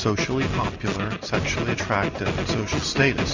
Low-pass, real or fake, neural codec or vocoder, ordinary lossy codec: 7.2 kHz; real; none; MP3, 64 kbps